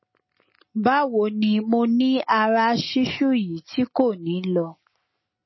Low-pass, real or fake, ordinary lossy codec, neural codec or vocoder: 7.2 kHz; real; MP3, 24 kbps; none